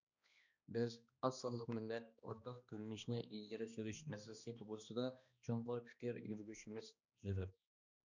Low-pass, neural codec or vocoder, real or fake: 7.2 kHz; codec, 16 kHz, 1 kbps, X-Codec, HuBERT features, trained on balanced general audio; fake